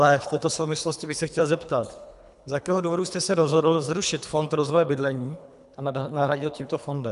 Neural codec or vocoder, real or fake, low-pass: codec, 24 kHz, 3 kbps, HILCodec; fake; 10.8 kHz